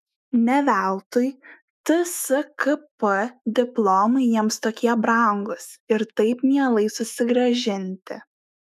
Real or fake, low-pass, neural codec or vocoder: fake; 14.4 kHz; autoencoder, 48 kHz, 128 numbers a frame, DAC-VAE, trained on Japanese speech